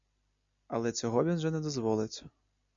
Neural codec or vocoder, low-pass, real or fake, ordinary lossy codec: none; 7.2 kHz; real; MP3, 64 kbps